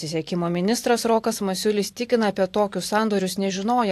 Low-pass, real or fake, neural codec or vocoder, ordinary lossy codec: 14.4 kHz; fake; vocoder, 44.1 kHz, 128 mel bands every 512 samples, BigVGAN v2; AAC, 64 kbps